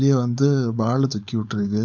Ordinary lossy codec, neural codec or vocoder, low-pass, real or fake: none; codec, 16 kHz, 4.8 kbps, FACodec; 7.2 kHz; fake